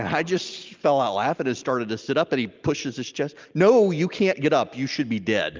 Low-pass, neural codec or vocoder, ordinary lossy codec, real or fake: 7.2 kHz; none; Opus, 24 kbps; real